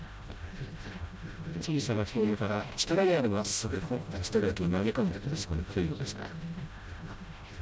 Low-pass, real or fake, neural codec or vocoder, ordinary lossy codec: none; fake; codec, 16 kHz, 0.5 kbps, FreqCodec, smaller model; none